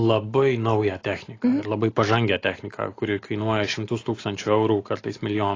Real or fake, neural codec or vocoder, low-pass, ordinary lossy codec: real; none; 7.2 kHz; AAC, 32 kbps